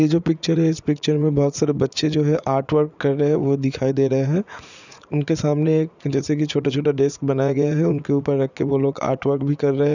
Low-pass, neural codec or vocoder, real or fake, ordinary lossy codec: 7.2 kHz; vocoder, 44.1 kHz, 80 mel bands, Vocos; fake; none